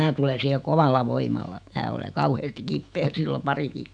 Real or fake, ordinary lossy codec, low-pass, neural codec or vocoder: fake; none; 9.9 kHz; autoencoder, 48 kHz, 128 numbers a frame, DAC-VAE, trained on Japanese speech